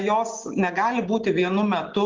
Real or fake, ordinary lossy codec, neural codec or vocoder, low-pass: real; Opus, 16 kbps; none; 7.2 kHz